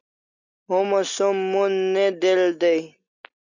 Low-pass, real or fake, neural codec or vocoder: 7.2 kHz; real; none